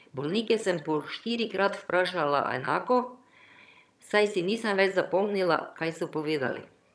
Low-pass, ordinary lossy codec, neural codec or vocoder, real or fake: none; none; vocoder, 22.05 kHz, 80 mel bands, HiFi-GAN; fake